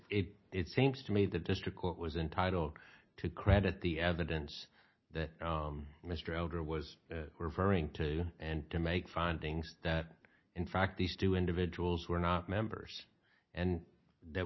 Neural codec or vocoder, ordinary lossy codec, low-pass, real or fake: none; MP3, 24 kbps; 7.2 kHz; real